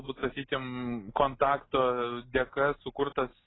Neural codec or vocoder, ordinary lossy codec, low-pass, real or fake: none; AAC, 16 kbps; 7.2 kHz; real